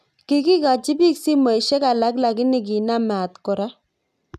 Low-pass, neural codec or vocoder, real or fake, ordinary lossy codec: 14.4 kHz; none; real; none